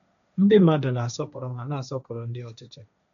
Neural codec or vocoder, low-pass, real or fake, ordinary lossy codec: codec, 16 kHz, 1.1 kbps, Voila-Tokenizer; 7.2 kHz; fake; none